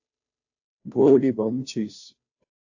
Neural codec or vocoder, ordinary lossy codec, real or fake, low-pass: codec, 16 kHz, 0.5 kbps, FunCodec, trained on Chinese and English, 25 frames a second; AAC, 48 kbps; fake; 7.2 kHz